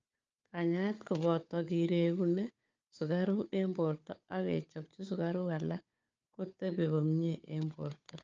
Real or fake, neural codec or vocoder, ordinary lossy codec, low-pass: fake; codec, 16 kHz, 4 kbps, FunCodec, trained on Chinese and English, 50 frames a second; Opus, 24 kbps; 7.2 kHz